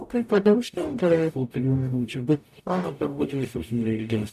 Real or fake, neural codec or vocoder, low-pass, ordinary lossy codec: fake; codec, 44.1 kHz, 0.9 kbps, DAC; 14.4 kHz; AAC, 96 kbps